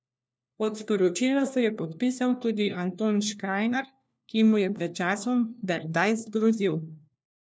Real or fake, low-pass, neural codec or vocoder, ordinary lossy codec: fake; none; codec, 16 kHz, 1 kbps, FunCodec, trained on LibriTTS, 50 frames a second; none